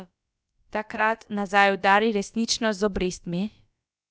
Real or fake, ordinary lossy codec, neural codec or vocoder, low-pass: fake; none; codec, 16 kHz, about 1 kbps, DyCAST, with the encoder's durations; none